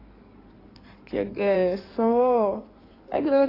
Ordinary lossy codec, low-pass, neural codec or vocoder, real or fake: AAC, 24 kbps; 5.4 kHz; codec, 16 kHz in and 24 kHz out, 2.2 kbps, FireRedTTS-2 codec; fake